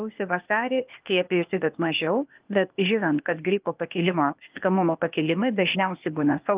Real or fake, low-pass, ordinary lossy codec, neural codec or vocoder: fake; 3.6 kHz; Opus, 24 kbps; codec, 16 kHz, 0.8 kbps, ZipCodec